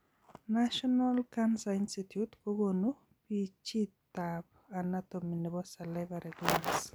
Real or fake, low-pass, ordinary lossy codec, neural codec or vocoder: real; none; none; none